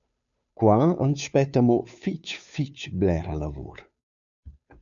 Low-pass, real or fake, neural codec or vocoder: 7.2 kHz; fake; codec, 16 kHz, 2 kbps, FunCodec, trained on Chinese and English, 25 frames a second